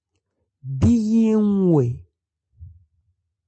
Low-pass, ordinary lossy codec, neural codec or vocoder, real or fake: 9.9 kHz; MP3, 32 kbps; none; real